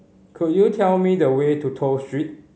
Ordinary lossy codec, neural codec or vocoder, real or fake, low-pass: none; none; real; none